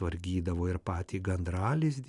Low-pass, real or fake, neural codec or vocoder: 10.8 kHz; real; none